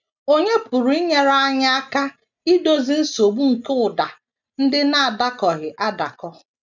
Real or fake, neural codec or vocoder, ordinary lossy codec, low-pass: real; none; none; 7.2 kHz